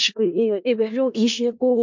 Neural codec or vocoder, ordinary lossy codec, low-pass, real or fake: codec, 16 kHz in and 24 kHz out, 0.4 kbps, LongCat-Audio-Codec, four codebook decoder; MP3, 64 kbps; 7.2 kHz; fake